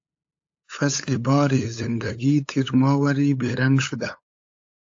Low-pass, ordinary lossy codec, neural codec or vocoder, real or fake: 7.2 kHz; AAC, 48 kbps; codec, 16 kHz, 8 kbps, FunCodec, trained on LibriTTS, 25 frames a second; fake